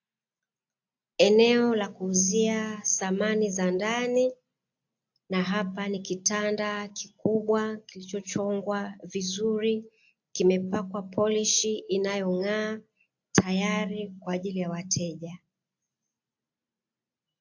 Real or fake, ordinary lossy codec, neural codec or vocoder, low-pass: real; AAC, 48 kbps; none; 7.2 kHz